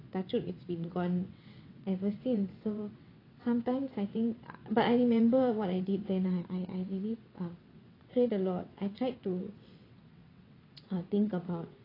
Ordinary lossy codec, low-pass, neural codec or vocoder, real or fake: AAC, 24 kbps; 5.4 kHz; vocoder, 22.05 kHz, 80 mel bands, WaveNeXt; fake